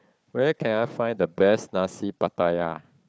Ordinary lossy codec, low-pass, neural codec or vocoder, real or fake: none; none; codec, 16 kHz, 4 kbps, FunCodec, trained on Chinese and English, 50 frames a second; fake